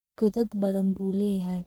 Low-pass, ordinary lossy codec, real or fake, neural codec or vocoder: none; none; fake; codec, 44.1 kHz, 1.7 kbps, Pupu-Codec